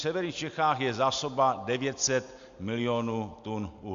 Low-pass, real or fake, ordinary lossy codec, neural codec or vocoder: 7.2 kHz; real; MP3, 64 kbps; none